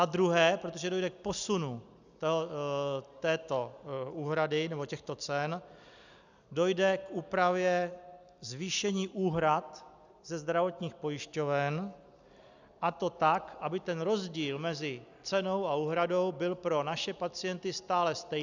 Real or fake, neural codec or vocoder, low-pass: real; none; 7.2 kHz